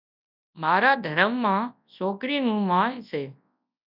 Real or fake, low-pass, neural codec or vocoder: fake; 5.4 kHz; codec, 24 kHz, 0.9 kbps, WavTokenizer, large speech release